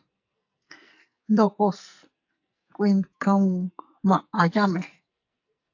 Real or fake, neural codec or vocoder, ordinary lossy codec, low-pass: fake; codec, 44.1 kHz, 2.6 kbps, SNAC; AAC, 48 kbps; 7.2 kHz